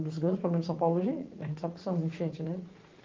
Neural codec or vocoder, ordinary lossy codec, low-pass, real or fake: vocoder, 44.1 kHz, 80 mel bands, Vocos; Opus, 32 kbps; 7.2 kHz; fake